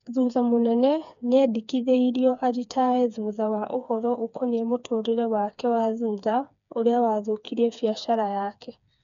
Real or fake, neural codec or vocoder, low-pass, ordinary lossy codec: fake; codec, 16 kHz, 4 kbps, FreqCodec, smaller model; 7.2 kHz; none